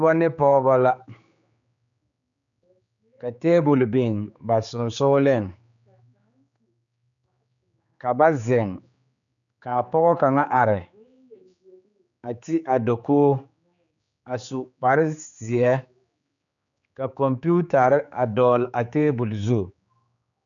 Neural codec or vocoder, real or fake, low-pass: codec, 16 kHz, 4 kbps, X-Codec, HuBERT features, trained on general audio; fake; 7.2 kHz